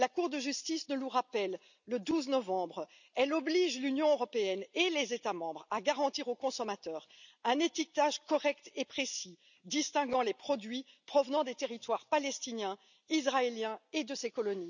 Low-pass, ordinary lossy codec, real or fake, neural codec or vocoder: 7.2 kHz; none; real; none